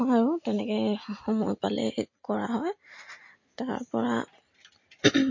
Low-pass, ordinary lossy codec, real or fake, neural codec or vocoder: 7.2 kHz; MP3, 32 kbps; real; none